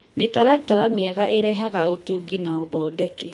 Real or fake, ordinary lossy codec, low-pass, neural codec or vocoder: fake; none; 10.8 kHz; codec, 24 kHz, 1.5 kbps, HILCodec